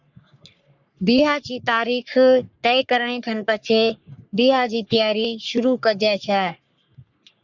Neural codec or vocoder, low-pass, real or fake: codec, 44.1 kHz, 3.4 kbps, Pupu-Codec; 7.2 kHz; fake